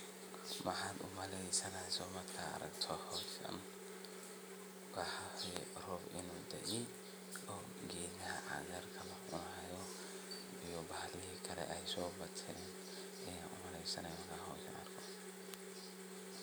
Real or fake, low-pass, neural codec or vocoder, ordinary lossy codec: real; none; none; none